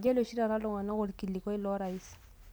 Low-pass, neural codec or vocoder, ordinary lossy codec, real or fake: none; none; none; real